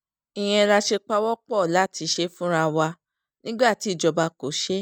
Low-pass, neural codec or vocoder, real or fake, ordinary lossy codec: none; none; real; none